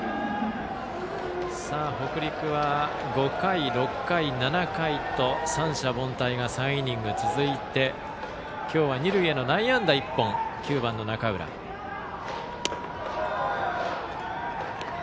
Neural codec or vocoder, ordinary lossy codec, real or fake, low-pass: none; none; real; none